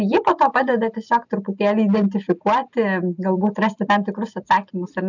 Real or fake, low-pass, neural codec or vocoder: real; 7.2 kHz; none